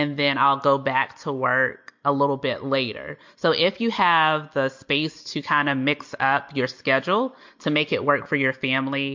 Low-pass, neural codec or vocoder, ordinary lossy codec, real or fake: 7.2 kHz; none; MP3, 48 kbps; real